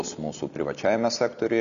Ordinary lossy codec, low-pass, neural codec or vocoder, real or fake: MP3, 96 kbps; 7.2 kHz; none; real